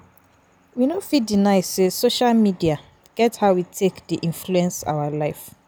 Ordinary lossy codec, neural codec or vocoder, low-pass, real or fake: none; none; none; real